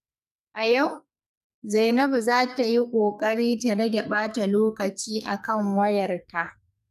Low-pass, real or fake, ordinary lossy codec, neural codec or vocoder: 14.4 kHz; fake; none; codec, 44.1 kHz, 2.6 kbps, SNAC